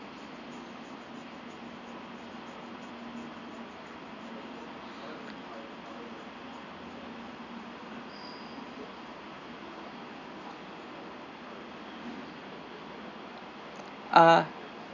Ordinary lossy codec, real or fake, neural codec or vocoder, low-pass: none; real; none; 7.2 kHz